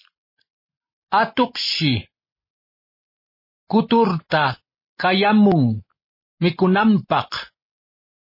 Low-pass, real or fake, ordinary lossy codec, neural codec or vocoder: 5.4 kHz; real; MP3, 24 kbps; none